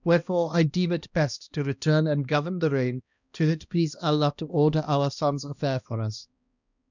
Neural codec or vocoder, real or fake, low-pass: codec, 16 kHz, 1 kbps, X-Codec, HuBERT features, trained on balanced general audio; fake; 7.2 kHz